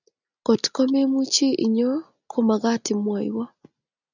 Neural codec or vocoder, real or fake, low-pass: none; real; 7.2 kHz